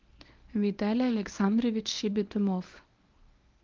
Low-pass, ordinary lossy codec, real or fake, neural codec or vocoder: 7.2 kHz; Opus, 32 kbps; fake; codec, 24 kHz, 0.9 kbps, WavTokenizer, medium speech release version 1